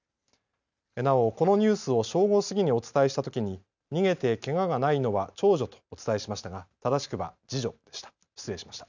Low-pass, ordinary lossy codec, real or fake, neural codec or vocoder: 7.2 kHz; none; real; none